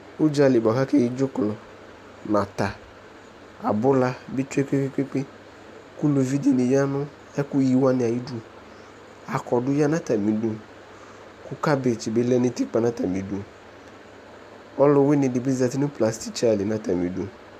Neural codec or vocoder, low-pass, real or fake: none; 14.4 kHz; real